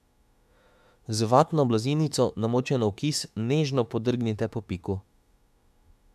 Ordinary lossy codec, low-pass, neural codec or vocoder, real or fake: MP3, 96 kbps; 14.4 kHz; autoencoder, 48 kHz, 32 numbers a frame, DAC-VAE, trained on Japanese speech; fake